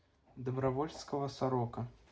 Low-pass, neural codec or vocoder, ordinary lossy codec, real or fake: none; none; none; real